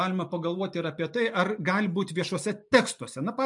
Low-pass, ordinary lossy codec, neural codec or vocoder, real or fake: 10.8 kHz; MP3, 96 kbps; none; real